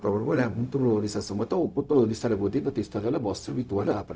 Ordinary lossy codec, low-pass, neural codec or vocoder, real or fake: none; none; codec, 16 kHz, 0.4 kbps, LongCat-Audio-Codec; fake